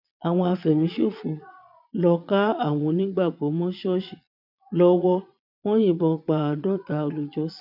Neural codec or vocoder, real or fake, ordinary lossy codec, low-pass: vocoder, 22.05 kHz, 80 mel bands, Vocos; fake; none; 5.4 kHz